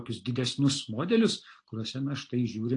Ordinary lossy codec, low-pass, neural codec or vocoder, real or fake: AAC, 48 kbps; 9.9 kHz; none; real